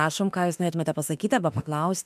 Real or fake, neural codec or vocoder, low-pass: fake; autoencoder, 48 kHz, 32 numbers a frame, DAC-VAE, trained on Japanese speech; 14.4 kHz